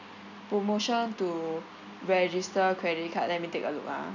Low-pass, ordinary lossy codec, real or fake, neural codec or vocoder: 7.2 kHz; none; real; none